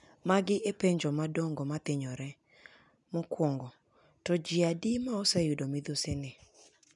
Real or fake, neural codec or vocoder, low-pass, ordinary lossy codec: real; none; 10.8 kHz; none